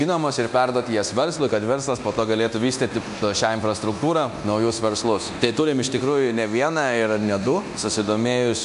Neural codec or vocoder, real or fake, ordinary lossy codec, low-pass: codec, 24 kHz, 0.9 kbps, DualCodec; fake; AAC, 96 kbps; 10.8 kHz